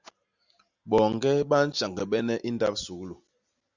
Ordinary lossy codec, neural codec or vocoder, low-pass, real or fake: Opus, 64 kbps; none; 7.2 kHz; real